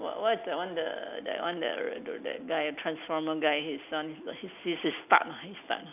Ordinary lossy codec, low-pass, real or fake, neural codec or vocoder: none; 3.6 kHz; real; none